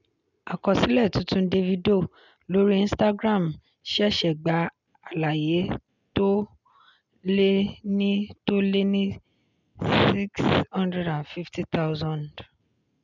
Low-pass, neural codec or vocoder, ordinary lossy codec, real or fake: 7.2 kHz; none; none; real